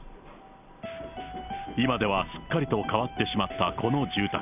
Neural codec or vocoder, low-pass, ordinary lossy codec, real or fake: none; 3.6 kHz; none; real